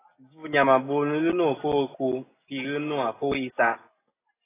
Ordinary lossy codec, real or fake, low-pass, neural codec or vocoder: AAC, 16 kbps; real; 3.6 kHz; none